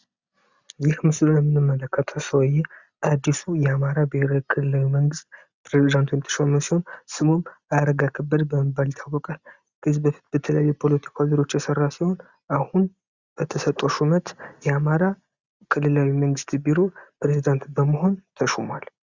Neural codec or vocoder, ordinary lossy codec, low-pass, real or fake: none; Opus, 64 kbps; 7.2 kHz; real